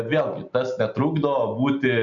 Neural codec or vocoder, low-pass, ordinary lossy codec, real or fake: none; 7.2 kHz; MP3, 64 kbps; real